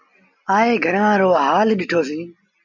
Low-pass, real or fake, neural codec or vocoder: 7.2 kHz; real; none